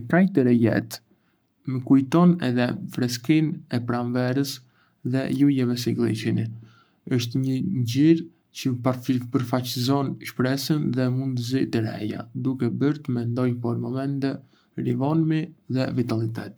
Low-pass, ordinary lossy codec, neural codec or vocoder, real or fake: none; none; codec, 44.1 kHz, 7.8 kbps, Pupu-Codec; fake